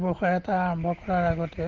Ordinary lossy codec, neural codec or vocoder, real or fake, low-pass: Opus, 16 kbps; none; real; 7.2 kHz